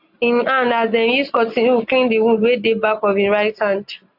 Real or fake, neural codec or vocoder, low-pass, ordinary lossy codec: real; none; 5.4 kHz; AAC, 48 kbps